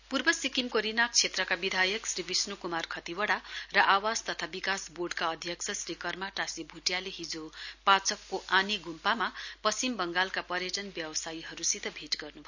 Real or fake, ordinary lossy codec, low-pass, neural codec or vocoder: real; none; 7.2 kHz; none